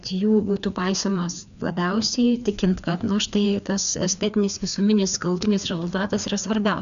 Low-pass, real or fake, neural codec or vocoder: 7.2 kHz; fake; codec, 16 kHz, 2 kbps, FreqCodec, larger model